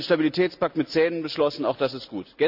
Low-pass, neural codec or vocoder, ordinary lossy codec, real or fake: 5.4 kHz; none; none; real